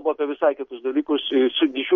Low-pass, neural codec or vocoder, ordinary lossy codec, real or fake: 7.2 kHz; none; MP3, 48 kbps; real